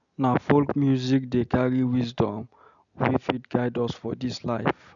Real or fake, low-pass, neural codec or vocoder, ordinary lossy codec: real; 7.2 kHz; none; none